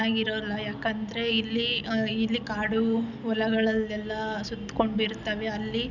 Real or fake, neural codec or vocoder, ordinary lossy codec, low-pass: real; none; none; 7.2 kHz